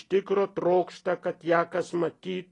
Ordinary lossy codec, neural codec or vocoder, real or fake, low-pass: AAC, 32 kbps; none; real; 10.8 kHz